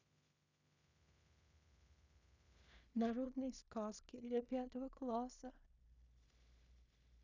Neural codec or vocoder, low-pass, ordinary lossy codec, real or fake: codec, 16 kHz in and 24 kHz out, 0.4 kbps, LongCat-Audio-Codec, two codebook decoder; 7.2 kHz; none; fake